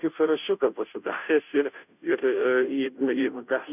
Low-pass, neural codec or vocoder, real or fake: 3.6 kHz; codec, 16 kHz, 0.5 kbps, FunCodec, trained on Chinese and English, 25 frames a second; fake